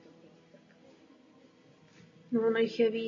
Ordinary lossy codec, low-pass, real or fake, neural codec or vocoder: AAC, 32 kbps; 7.2 kHz; real; none